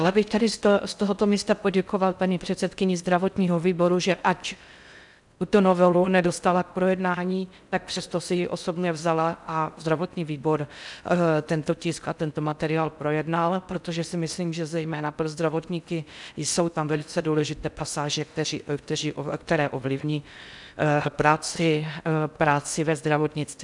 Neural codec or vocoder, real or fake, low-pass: codec, 16 kHz in and 24 kHz out, 0.6 kbps, FocalCodec, streaming, 4096 codes; fake; 10.8 kHz